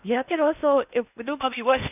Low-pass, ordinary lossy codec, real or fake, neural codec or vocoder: 3.6 kHz; none; fake; codec, 16 kHz in and 24 kHz out, 0.6 kbps, FocalCodec, streaming, 2048 codes